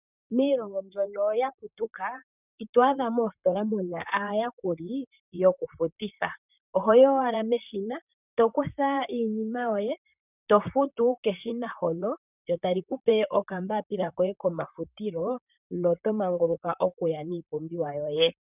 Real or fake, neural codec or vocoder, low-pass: fake; vocoder, 44.1 kHz, 128 mel bands, Pupu-Vocoder; 3.6 kHz